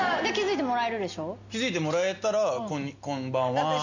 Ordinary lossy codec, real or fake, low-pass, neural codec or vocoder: none; real; 7.2 kHz; none